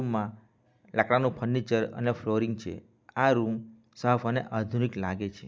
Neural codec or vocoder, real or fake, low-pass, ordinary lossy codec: none; real; none; none